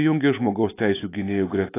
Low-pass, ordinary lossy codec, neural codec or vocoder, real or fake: 3.6 kHz; AAC, 16 kbps; none; real